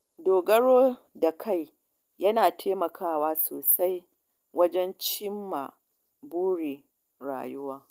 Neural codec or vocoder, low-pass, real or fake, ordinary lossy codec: none; 14.4 kHz; real; Opus, 32 kbps